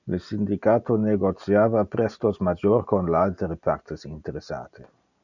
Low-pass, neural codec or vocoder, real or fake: 7.2 kHz; none; real